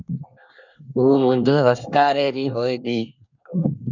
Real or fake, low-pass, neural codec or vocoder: fake; 7.2 kHz; codec, 24 kHz, 1 kbps, SNAC